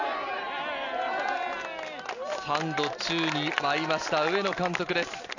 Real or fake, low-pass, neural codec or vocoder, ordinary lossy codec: real; 7.2 kHz; none; none